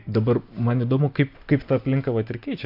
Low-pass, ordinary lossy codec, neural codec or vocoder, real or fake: 5.4 kHz; MP3, 48 kbps; none; real